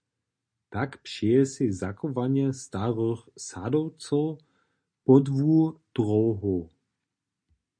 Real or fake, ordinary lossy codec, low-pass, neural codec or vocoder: real; MP3, 48 kbps; 9.9 kHz; none